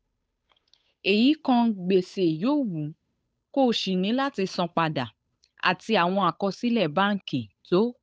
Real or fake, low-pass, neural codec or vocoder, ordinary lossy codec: fake; none; codec, 16 kHz, 8 kbps, FunCodec, trained on Chinese and English, 25 frames a second; none